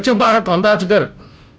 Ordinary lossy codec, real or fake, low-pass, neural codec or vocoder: none; fake; none; codec, 16 kHz, 0.5 kbps, FunCodec, trained on Chinese and English, 25 frames a second